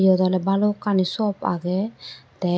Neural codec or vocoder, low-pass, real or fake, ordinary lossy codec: none; none; real; none